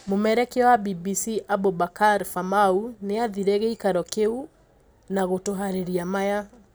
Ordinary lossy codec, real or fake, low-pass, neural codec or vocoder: none; real; none; none